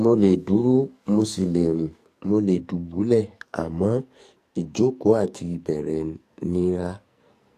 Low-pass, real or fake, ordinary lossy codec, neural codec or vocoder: 14.4 kHz; fake; AAC, 64 kbps; codec, 44.1 kHz, 2.6 kbps, SNAC